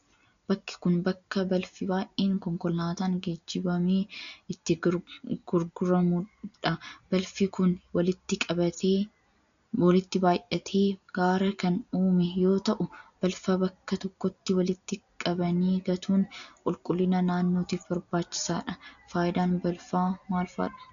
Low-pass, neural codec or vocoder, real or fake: 7.2 kHz; none; real